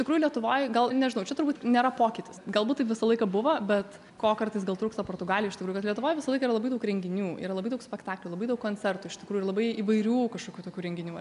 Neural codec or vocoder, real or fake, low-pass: none; real; 10.8 kHz